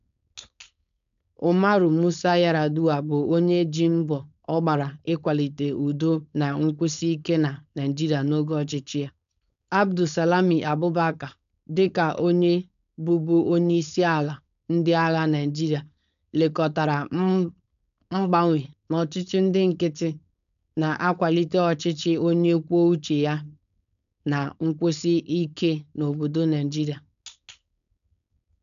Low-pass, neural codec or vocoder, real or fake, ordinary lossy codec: 7.2 kHz; codec, 16 kHz, 4.8 kbps, FACodec; fake; none